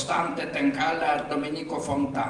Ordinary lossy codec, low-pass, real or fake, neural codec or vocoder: Opus, 32 kbps; 10.8 kHz; real; none